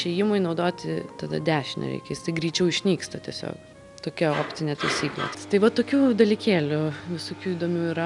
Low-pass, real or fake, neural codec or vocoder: 10.8 kHz; real; none